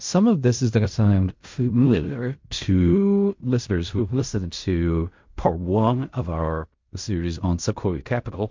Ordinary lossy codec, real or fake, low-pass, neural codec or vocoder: MP3, 48 kbps; fake; 7.2 kHz; codec, 16 kHz in and 24 kHz out, 0.4 kbps, LongCat-Audio-Codec, fine tuned four codebook decoder